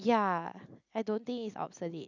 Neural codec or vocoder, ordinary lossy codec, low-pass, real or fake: none; none; 7.2 kHz; real